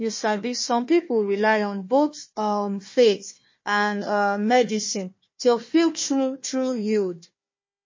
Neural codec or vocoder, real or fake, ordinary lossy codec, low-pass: codec, 16 kHz, 1 kbps, FunCodec, trained on Chinese and English, 50 frames a second; fake; MP3, 32 kbps; 7.2 kHz